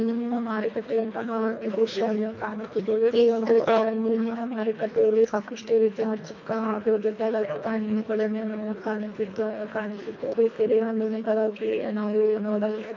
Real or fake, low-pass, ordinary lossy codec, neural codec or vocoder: fake; 7.2 kHz; MP3, 48 kbps; codec, 24 kHz, 1.5 kbps, HILCodec